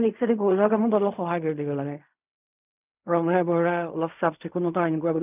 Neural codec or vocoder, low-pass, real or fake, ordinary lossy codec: codec, 16 kHz in and 24 kHz out, 0.4 kbps, LongCat-Audio-Codec, fine tuned four codebook decoder; 3.6 kHz; fake; none